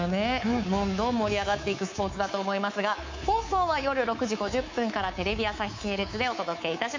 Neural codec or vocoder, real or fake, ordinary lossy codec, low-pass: codec, 24 kHz, 3.1 kbps, DualCodec; fake; MP3, 64 kbps; 7.2 kHz